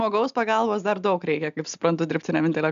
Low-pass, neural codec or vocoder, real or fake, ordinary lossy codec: 7.2 kHz; none; real; AAC, 96 kbps